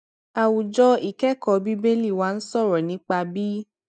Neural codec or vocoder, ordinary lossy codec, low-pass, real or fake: none; none; 9.9 kHz; real